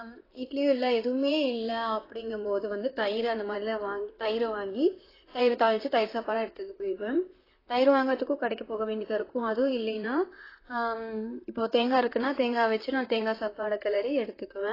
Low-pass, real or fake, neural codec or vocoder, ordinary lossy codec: 5.4 kHz; fake; vocoder, 44.1 kHz, 128 mel bands, Pupu-Vocoder; AAC, 24 kbps